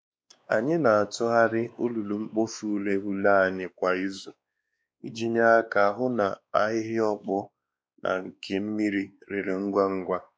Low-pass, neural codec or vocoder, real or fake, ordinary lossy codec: none; codec, 16 kHz, 2 kbps, X-Codec, WavLM features, trained on Multilingual LibriSpeech; fake; none